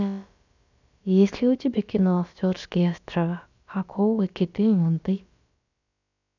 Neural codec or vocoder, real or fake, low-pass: codec, 16 kHz, about 1 kbps, DyCAST, with the encoder's durations; fake; 7.2 kHz